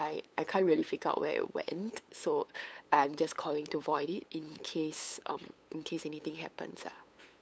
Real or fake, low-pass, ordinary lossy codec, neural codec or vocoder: fake; none; none; codec, 16 kHz, 8 kbps, FunCodec, trained on LibriTTS, 25 frames a second